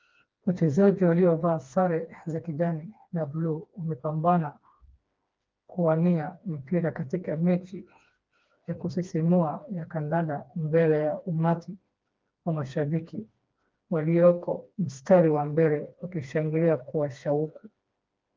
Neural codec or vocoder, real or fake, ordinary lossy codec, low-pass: codec, 16 kHz, 2 kbps, FreqCodec, smaller model; fake; Opus, 24 kbps; 7.2 kHz